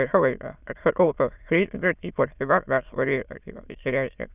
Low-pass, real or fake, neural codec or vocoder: 3.6 kHz; fake; autoencoder, 22.05 kHz, a latent of 192 numbers a frame, VITS, trained on many speakers